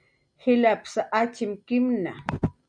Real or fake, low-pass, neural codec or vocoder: real; 9.9 kHz; none